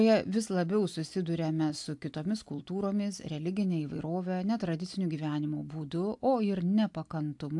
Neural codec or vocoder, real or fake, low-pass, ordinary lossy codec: none; real; 10.8 kHz; AAC, 64 kbps